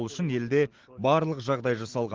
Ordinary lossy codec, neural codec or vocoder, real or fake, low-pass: Opus, 16 kbps; none; real; 7.2 kHz